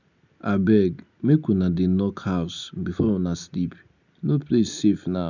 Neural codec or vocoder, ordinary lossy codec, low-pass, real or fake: none; none; 7.2 kHz; real